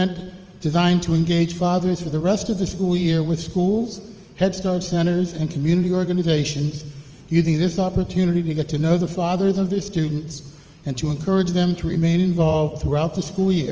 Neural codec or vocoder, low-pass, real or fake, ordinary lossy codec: vocoder, 44.1 kHz, 80 mel bands, Vocos; 7.2 kHz; fake; Opus, 24 kbps